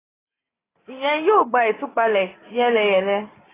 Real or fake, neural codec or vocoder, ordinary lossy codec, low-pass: real; none; AAC, 16 kbps; 3.6 kHz